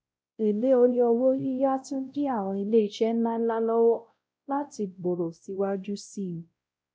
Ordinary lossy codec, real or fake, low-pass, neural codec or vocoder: none; fake; none; codec, 16 kHz, 0.5 kbps, X-Codec, WavLM features, trained on Multilingual LibriSpeech